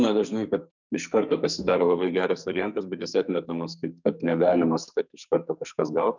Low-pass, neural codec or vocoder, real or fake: 7.2 kHz; codec, 44.1 kHz, 2.6 kbps, SNAC; fake